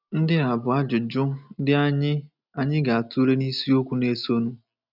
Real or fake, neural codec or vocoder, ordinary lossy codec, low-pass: real; none; none; 5.4 kHz